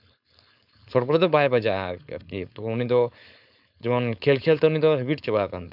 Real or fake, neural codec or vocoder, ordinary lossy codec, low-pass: fake; codec, 16 kHz, 4.8 kbps, FACodec; none; 5.4 kHz